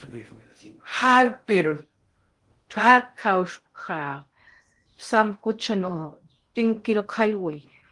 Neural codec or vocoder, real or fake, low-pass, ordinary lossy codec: codec, 16 kHz in and 24 kHz out, 0.6 kbps, FocalCodec, streaming, 4096 codes; fake; 10.8 kHz; Opus, 24 kbps